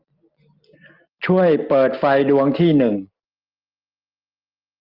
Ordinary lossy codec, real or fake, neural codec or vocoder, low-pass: Opus, 24 kbps; real; none; 5.4 kHz